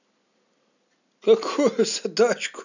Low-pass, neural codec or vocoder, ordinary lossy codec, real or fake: 7.2 kHz; none; none; real